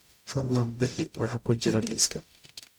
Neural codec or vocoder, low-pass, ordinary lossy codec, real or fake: codec, 44.1 kHz, 0.9 kbps, DAC; none; none; fake